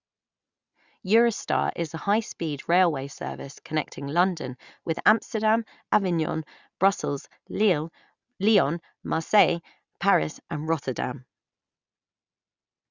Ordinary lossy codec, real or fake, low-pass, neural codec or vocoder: Opus, 64 kbps; real; 7.2 kHz; none